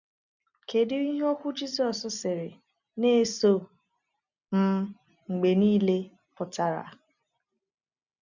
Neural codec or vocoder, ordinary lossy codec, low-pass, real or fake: none; none; 7.2 kHz; real